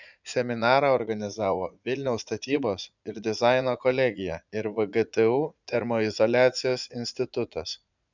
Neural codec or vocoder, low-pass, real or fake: vocoder, 44.1 kHz, 80 mel bands, Vocos; 7.2 kHz; fake